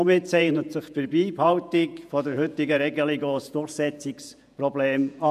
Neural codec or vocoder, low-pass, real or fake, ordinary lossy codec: vocoder, 48 kHz, 128 mel bands, Vocos; 14.4 kHz; fake; none